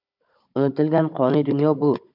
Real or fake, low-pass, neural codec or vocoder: fake; 5.4 kHz; codec, 16 kHz, 16 kbps, FunCodec, trained on Chinese and English, 50 frames a second